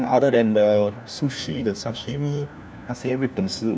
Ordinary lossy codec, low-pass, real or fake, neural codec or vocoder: none; none; fake; codec, 16 kHz, 1 kbps, FunCodec, trained on LibriTTS, 50 frames a second